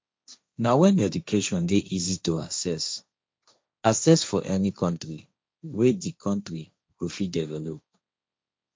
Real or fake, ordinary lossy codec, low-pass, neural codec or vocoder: fake; none; none; codec, 16 kHz, 1.1 kbps, Voila-Tokenizer